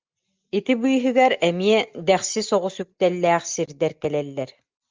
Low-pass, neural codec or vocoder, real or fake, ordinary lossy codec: 7.2 kHz; none; real; Opus, 32 kbps